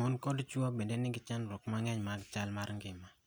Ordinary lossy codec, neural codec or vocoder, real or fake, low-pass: none; none; real; none